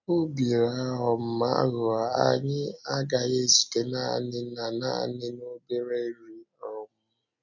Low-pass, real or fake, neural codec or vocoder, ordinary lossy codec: 7.2 kHz; real; none; none